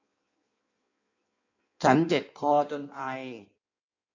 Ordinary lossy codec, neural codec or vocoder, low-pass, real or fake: AAC, 48 kbps; codec, 16 kHz in and 24 kHz out, 1.1 kbps, FireRedTTS-2 codec; 7.2 kHz; fake